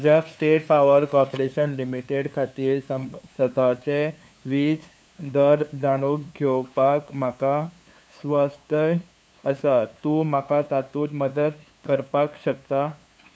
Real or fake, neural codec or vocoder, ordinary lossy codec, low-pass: fake; codec, 16 kHz, 2 kbps, FunCodec, trained on LibriTTS, 25 frames a second; none; none